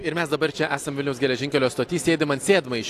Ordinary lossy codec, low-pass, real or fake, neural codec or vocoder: AAC, 64 kbps; 14.4 kHz; real; none